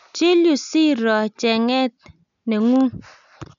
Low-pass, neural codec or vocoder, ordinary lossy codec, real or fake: 7.2 kHz; none; none; real